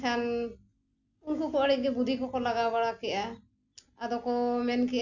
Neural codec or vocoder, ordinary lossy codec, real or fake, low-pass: none; none; real; 7.2 kHz